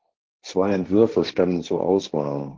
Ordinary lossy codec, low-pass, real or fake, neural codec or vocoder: Opus, 16 kbps; 7.2 kHz; fake; codec, 16 kHz, 1.1 kbps, Voila-Tokenizer